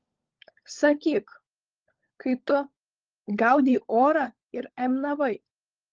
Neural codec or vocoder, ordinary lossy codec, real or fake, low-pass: codec, 16 kHz, 16 kbps, FunCodec, trained on LibriTTS, 50 frames a second; Opus, 16 kbps; fake; 7.2 kHz